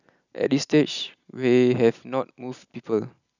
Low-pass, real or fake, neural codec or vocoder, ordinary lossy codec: 7.2 kHz; real; none; none